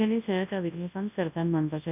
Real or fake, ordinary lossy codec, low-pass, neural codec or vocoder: fake; none; 3.6 kHz; codec, 24 kHz, 0.9 kbps, WavTokenizer, large speech release